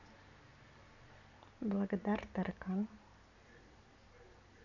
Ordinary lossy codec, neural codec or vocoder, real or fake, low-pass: none; none; real; 7.2 kHz